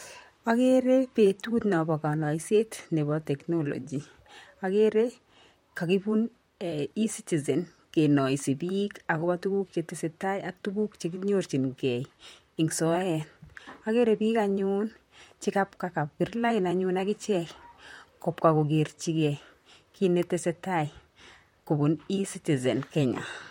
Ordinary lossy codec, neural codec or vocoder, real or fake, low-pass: MP3, 64 kbps; vocoder, 44.1 kHz, 128 mel bands, Pupu-Vocoder; fake; 19.8 kHz